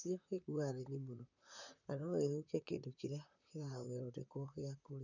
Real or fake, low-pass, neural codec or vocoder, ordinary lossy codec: fake; 7.2 kHz; vocoder, 44.1 kHz, 128 mel bands, Pupu-Vocoder; none